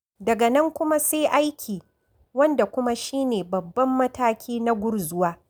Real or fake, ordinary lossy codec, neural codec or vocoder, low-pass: real; none; none; none